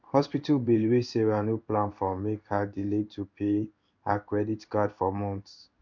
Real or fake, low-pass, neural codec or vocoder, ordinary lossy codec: fake; 7.2 kHz; codec, 16 kHz in and 24 kHz out, 1 kbps, XY-Tokenizer; none